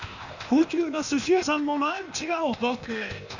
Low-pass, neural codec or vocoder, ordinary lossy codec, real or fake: 7.2 kHz; codec, 16 kHz, 0.8 kbps, ZipCodec; none; fake